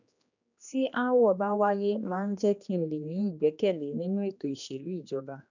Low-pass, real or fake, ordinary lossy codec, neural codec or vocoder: 7.2 kHz; fake; none; codec, 16 kHz, 2 kbps, X-Codec, HuBERT features, trained on general audio